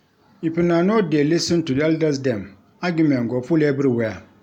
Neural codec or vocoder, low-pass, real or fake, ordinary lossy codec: none; 19.8 kHz; real; none